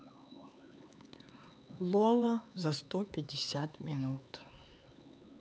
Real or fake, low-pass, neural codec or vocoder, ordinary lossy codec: fake; none; codec, 16 kHz, 4 kbps, X-Codec, HuBERT features, trained on LibriSpeech; none